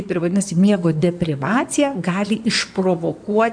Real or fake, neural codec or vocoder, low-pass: fake; codec, 16 kHz in and 24 kHz out, 2.2 kbps, FireRedTTS-2 codec; 9.9 kHz